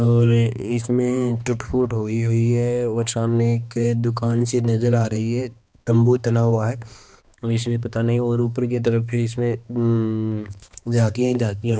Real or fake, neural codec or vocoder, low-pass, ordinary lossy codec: fake; codec, 16 kHz, 2 kbps, X-Codec, HuBERT features, trained on balanced general audio; none; none